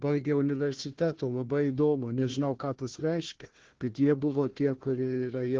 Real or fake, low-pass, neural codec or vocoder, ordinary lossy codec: fake; 7.2 kHz; codec, 16 kHz, 1 kbps, FunCodec, trained on Chinese and English, 50 frames a second; Opus, 16 kbps